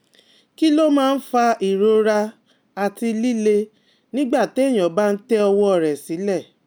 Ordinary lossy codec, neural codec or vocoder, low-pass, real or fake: none; none; none; real